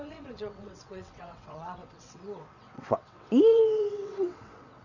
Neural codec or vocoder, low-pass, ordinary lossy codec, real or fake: vocoder, 22.05 kHz, 80 mel bands, WaveNeXt; 7.2 kHz; none; fake